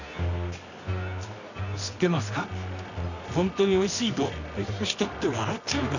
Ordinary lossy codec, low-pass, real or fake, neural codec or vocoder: none; 7.2 kHz; fake; codec, 24 kHz, 0.9 kbps, WavTokenizer, medium music audio release